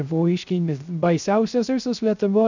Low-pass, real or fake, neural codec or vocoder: 7.2 kHz; fake; codec, 16 kHz, 0.3 kbps, FocalCodec